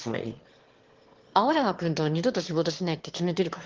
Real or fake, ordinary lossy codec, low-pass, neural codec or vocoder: fake; Opus, 16 kbps; 7.2 kHz; autoencoder, 22.05 kHz, a latent of 192 numbers a frame, VITS, trained on one speaker